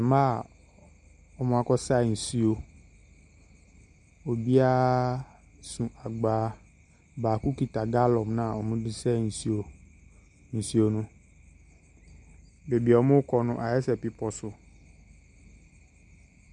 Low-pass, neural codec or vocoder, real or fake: 10.8 kHz; none; real